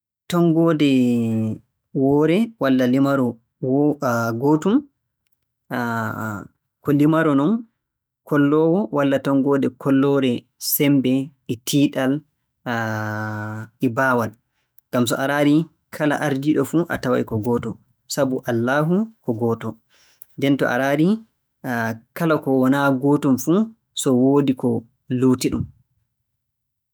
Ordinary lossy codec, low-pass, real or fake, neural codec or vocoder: none; none; fake; autoencoder, 48 kHz, 128 numbers a frame, DAC-VAE, trained on Japanese speech